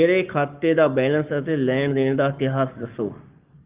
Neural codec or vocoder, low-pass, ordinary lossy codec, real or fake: codec, 44.1 kHz, 7.8 kbps, Pupu-Codec; 3.6 kHz; Opus, 24 kbps; fake